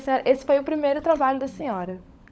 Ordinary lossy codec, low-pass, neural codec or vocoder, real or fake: none; none; codec, 16 kHz, 16 kbps, FunCodec, trained on LibriTTS, 50 frames a second; fake